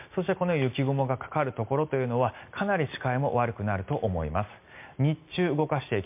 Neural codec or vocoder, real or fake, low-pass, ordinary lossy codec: none; real; 3.6 kHz; MP3, 32 kbps